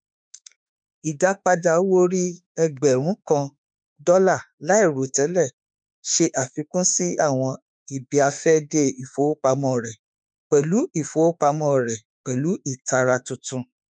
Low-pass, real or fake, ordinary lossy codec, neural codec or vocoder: 9.9 kHz; fake; none; autoencoder, 48 kHz, 32 numbers a frame, DAC-VAE, trained on Japanese speech